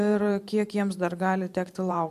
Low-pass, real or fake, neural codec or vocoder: 14.4 kHz; fake; vocoder, 44.1 kHz, 128 mel bands every 512 samples, BigVGAN v2